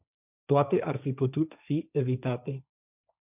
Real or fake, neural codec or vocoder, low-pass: fake; codec, 16 kHz, 1.1 kbps, Voila-Tokenizer; 3.6 kHz